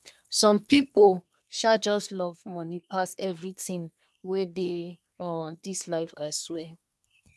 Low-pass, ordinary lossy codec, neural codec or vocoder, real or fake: none; none; codec, 24 kHz, 1 kbps, SNAC; fake